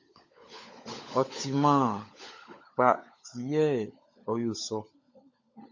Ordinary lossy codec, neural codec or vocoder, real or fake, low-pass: MP3, 48 kbps; codec, 16 kHz, 16 kbps, FunCodec, trained on LibriTTS, 50 frames a second; fake; 7.2 kHz